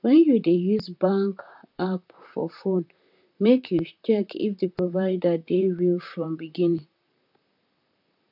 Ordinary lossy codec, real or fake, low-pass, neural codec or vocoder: AAC, 48 kbps; fake; 5.4 kHz; vocoder, 44.1 kHz, 128 mel bands, Pupu-Vocoder